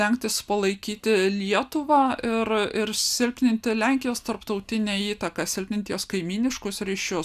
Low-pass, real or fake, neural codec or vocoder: 14.4 kHz; real; none